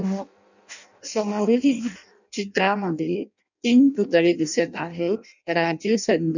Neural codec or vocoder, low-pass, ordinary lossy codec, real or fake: codec, 16 kHz in and 24 kHz out, 0.6 kbps, FireRedTTS-2 codec; 7.2 kHz; none; fake